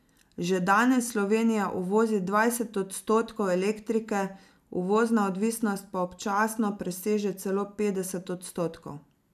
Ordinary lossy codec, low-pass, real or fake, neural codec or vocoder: none; 14.4 kHz; real; none